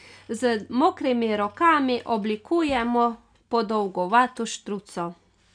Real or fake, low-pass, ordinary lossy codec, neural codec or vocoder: real; 9.9 kHz; none; none